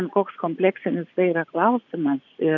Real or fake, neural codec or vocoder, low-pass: fake; vocoder, 24 kHz, 100 mel bands, Vocos; 7.2 kHz